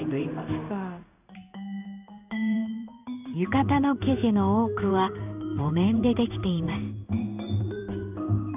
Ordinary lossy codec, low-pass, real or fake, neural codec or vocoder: none; 3.6 kHz; fake; codec, 16 kHz in and 24 kHz out, 1 kbps, XY-Tokenizer